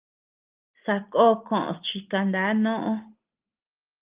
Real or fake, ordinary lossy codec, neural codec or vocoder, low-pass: real; Opus, 24 kbps; none; 3.6 kHz